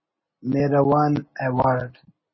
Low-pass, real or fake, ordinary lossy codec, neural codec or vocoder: 7.2 kHz; real; MP3, 24 kbps; none